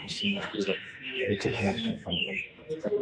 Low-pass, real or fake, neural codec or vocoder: 9.9 kHz; fake; autoencoder, 48 kHz, 32 numbers a frame, DAC-VAE, trained on Japanese speech